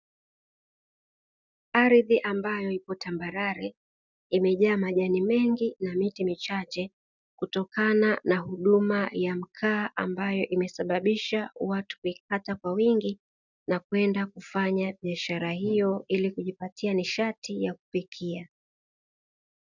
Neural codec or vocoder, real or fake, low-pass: none; real; 7.2 kHz